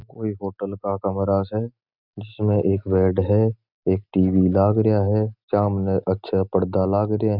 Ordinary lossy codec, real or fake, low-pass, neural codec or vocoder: none; real; 5.4 kHz; none